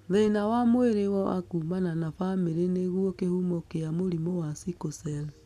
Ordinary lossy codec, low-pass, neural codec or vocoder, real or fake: none; 14.4 kHz; none; real